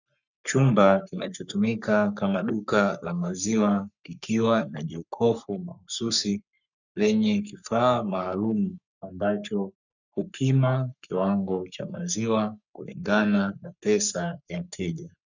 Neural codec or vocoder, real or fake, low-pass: codec, 44.1 kHz, 3.4 kbps, Pupu-Codec; fake; 7.2 kHz